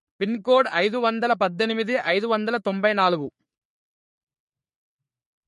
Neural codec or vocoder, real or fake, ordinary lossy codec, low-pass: codec, 44.1 kHz, 3.4 kbps, Pupu-Codec; fake; MP3, 48 kbps; 14.4 kHz